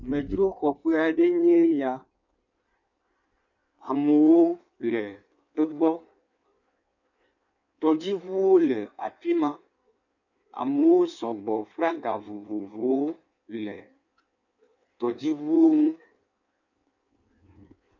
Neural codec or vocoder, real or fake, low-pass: codec, 16 kHz in and 24 kHz out, 1.1 kbps, FireRedTTS-2 codec; fake; 7.2 kHz